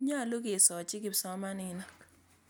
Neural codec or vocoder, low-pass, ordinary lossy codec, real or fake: none; none; none; real